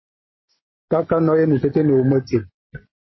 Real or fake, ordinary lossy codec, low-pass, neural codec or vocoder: real; MP3, 24 kbps; 7.2 kHz; none